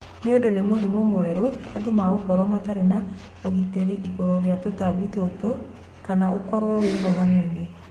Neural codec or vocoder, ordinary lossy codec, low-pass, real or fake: codec, 32 kHz, 1.9 kbps, SNAC; Opus, 24 kbps; 14.4 kHz; fake